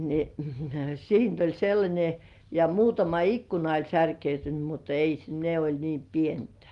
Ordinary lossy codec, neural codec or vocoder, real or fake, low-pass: Opus, 24 kbps; none; real; 10.8 kHz